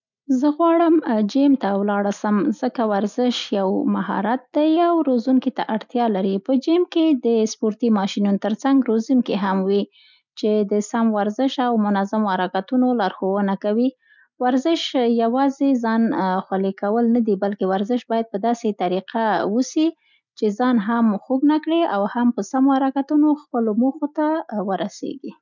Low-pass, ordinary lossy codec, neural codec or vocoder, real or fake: 7.2 kHz; none; none; real